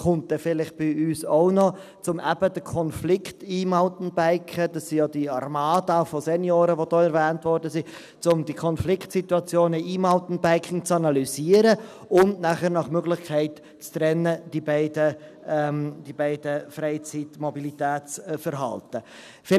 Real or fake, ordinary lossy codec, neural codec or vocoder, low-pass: real; none; none; 14.4 kHz